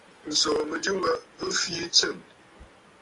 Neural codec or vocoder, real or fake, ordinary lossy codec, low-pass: none; real; AAC, 32 kbps; 10.8 kHz